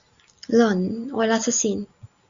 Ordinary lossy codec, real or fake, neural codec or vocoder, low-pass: Opus, 64 kbps; real; none; 7.2 kHz